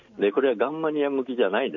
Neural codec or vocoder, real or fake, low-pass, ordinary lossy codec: none; real; 7.2 kHz; none